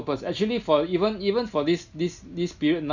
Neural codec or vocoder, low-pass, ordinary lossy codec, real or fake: none; 7.2 kHz; none; real